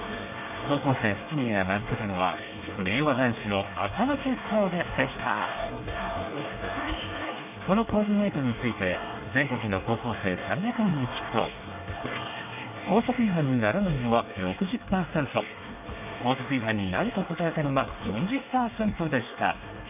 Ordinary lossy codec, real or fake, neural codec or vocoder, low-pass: none; fake; codec, 24 kHz, 1 kbps, SNAC; 3.6 kHz